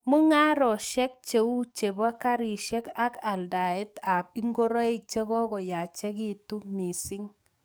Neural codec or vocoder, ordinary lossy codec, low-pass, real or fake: codec, 44.1 kHz, 7.8 kbps, DAC; none; none; fake